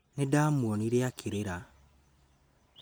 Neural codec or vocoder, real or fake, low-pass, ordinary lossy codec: none; real; none; none